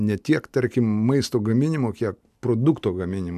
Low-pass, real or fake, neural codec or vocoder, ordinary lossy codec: 14.4 kHz; real; none; AAC, 96 kbps